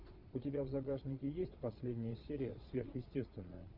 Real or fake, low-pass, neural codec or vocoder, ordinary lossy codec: fake; 5.4 kHz; vocoder, 22.05 kHz, 80 mel bands, Vocos; Opus, 64 kbps